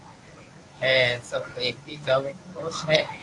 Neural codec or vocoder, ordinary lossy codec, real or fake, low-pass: codec, 24 kHz, 0.9 kbps, WavTokenizer, medium speech release version 1; AAC, 48 kbps; fake; 10.8 kHz